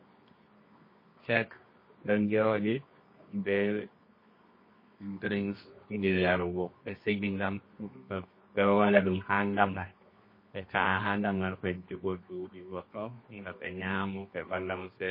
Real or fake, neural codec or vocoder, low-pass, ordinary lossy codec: fake; codec, 24 kHz, 0.9 kbps, WavTokenizer, medium music audio release; 5.4 kHz; MP3, 24 kbps